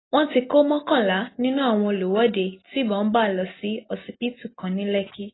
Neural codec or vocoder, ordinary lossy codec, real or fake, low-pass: none; AAC, 16 kbps; real; 7.2 kHz